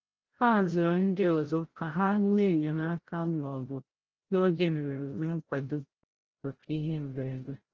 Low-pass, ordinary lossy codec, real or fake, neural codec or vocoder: 7.2 kHz; Opus, 16 kbps; fake; codec, 16 kHz, 0.5 kbps, FreqCodec, larger model